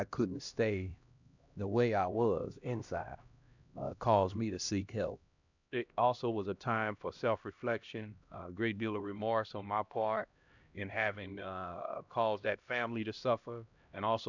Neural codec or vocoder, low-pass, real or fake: codec, 16 kHz, 1 kbps, X-Codec, HuBERT features, trained on LibriSpeech; 7.2 kHz; fake